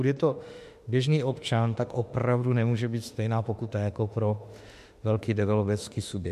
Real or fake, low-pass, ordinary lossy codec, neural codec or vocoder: fake; 14.4 kHz; MP3, 64 kbps; autoencoder, 48 kHz, 32 numbers a frame, DAC-VAE, trained on Japanese speech